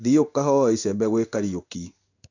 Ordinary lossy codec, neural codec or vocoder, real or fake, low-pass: none; codec, 16 kHz in and 24 kHz out, 1 kbps, XY-Tokenizer; fake; 7.2 kHz